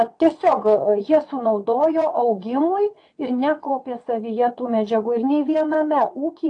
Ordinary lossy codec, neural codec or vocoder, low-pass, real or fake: AAC, 48 kbps; vocoder, 22.05 kHz, 80 mel bands, WaveNeXt; 9.9 kHz; fake